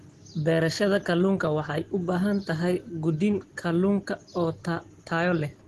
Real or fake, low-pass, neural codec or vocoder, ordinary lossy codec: real; 10.8 kHz; none; Opus, 16 kbps